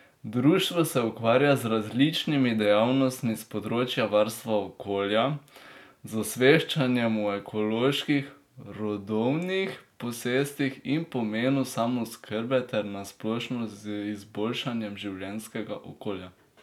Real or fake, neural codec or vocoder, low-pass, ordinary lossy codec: real; none; 19.8 kHz; none